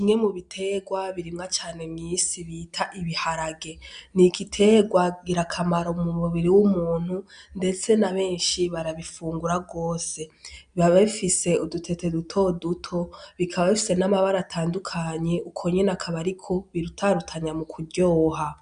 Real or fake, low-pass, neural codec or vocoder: real; 9.9 kHz; none